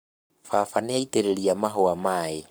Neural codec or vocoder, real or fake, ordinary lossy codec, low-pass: codec, 44.1 kHz, 7.8 kbps, Pupu-Codec; fake; none; none